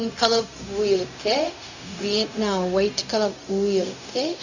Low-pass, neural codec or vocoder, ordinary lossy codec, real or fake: 7.2 kHz; codec, 16 kHz, 0.4 kbps, LongCat-Audio-Codec; none; fake